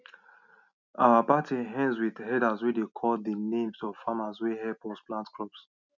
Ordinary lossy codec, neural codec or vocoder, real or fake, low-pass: none; none; real; 7.2 kHz